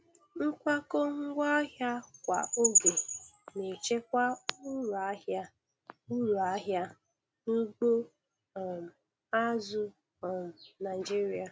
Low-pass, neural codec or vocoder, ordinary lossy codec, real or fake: none; none; none; real